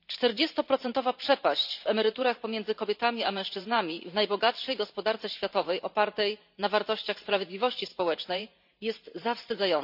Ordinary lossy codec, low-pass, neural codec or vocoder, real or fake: none; 5.4 kHz; vocoder, 44.1 kHz, 128 mel bands every 512 samples, BigVGAN v2; fake